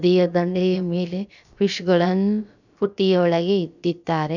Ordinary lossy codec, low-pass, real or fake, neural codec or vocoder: none; 7.2 kHz; fake; codec, 16 kHz, about 1 kbps, DyCAST, with the encoder's durations